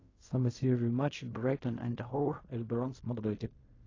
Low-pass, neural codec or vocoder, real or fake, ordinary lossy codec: 7.2 kHz; codec, 16 kHz in and 24 kHz out, 0.4 kbps, LongCat-Audio-Codec, fine tuned four codebook decoder; fake; AAC, 32 kbps